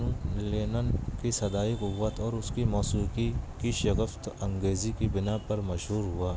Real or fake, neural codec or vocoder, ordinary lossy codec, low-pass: real; none; none; none